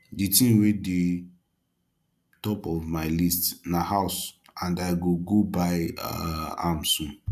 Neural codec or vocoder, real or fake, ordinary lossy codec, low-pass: none; real; none; 14.4 kHz